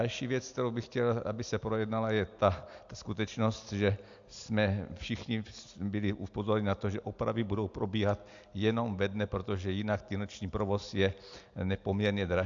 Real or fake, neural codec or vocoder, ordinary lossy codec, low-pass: real; none; AAC, 64 kbps; 7.2 kHz